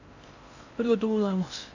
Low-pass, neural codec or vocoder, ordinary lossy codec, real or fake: 7.2 kHz; codec, 16 kHz in and 24 kHz out, 0.8 kbps, FocalCodec, streaming, 65536 codes; none; fake